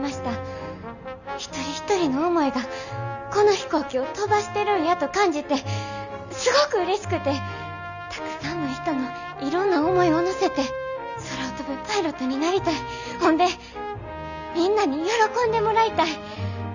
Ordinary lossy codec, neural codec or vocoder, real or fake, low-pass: none; none; real; 7.2 kHz